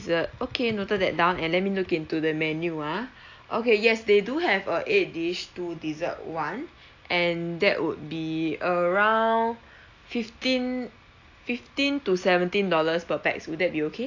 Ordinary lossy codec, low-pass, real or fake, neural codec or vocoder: AAC, 48 kbps; 7.2 kHz; real; none